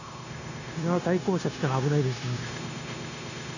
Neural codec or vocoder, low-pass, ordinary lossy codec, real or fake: codec, 16 kHz, 0.9 kbps, LongCat-Audio-Codec; 7.2 kHz; none; fake